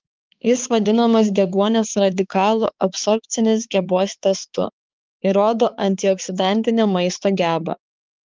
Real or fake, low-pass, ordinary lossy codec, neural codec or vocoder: fake; 7.2 kHz; Opus, 32 kbps; codec, 16 kHz, 4 kbps, X-Codec, HuBERT features, trained on balanced general audio